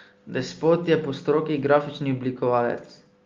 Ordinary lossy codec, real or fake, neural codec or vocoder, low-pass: Opus, 32 kbps; real; none; 7.2 kHz